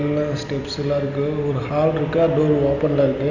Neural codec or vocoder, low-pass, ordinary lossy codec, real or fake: none; 7.2 kHz; none; real